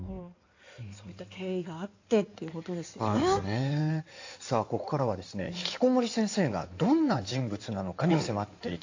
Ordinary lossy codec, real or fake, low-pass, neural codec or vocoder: AAC, 48 kbps; fake; 7.2 kHz; codec, 16 kHz in and 24 kHz out, 2.2 kbps, FireRedTTS-2 codec